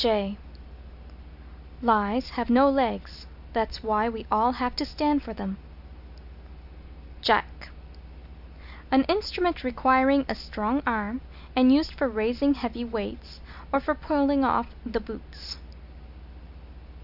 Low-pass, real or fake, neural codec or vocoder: 5.4 kHz; real; none